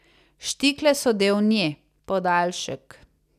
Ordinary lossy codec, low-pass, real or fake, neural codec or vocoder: none; 14.4 kHz; real; none